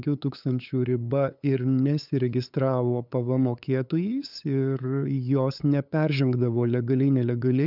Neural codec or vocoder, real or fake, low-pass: codec, 16 kHz, 8 kbps, FunCodec, trained on LibriTTS, 25 frames a second; fake; 5.4 kHz